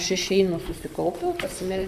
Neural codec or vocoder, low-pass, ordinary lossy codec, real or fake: vocoder, 44.1 kHz, 128 mel bands, Pupu-Vocoder; 14.4 kHz; MP3, 96 kbps; fake